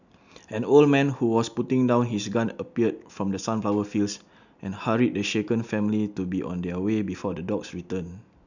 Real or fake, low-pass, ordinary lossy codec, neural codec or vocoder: real; 7.2 kHz; none; none